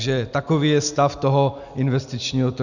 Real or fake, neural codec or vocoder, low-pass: real; none; 7.2 kHz